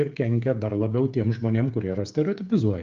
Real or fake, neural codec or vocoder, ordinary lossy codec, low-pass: fake; codec, 16 kHz, 8 kbps, FreqCodec, smaller model; Opus, 32 kbps; 7.2 kHz